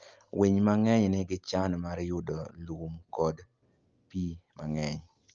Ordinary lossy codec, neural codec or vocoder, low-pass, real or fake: Opus, 24 kbps; none; 7.2 kHz; real